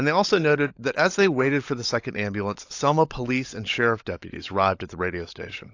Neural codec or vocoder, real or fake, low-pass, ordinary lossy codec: codec, 16 kHz, 16 kbps, FunCodec, trained on LibriTTS, 50 frames a second; fake; 7.2 kHz; AAC, 48 kbps